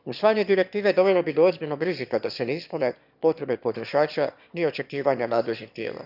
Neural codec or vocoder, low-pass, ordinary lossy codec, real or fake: autoencoder, 22.05 kHz, a latent of 192 numbers a frame, VITS, trained on one speaker; 5.4 kHz; none; fake